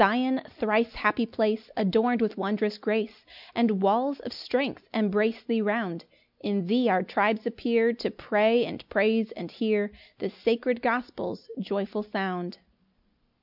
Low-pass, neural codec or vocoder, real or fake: 5.4 kHz; none; real